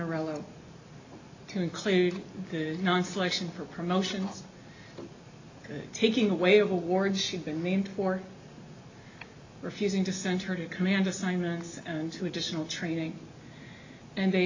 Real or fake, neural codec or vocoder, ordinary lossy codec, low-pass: real; none; AAC, 48 kbps; 7.2 kHz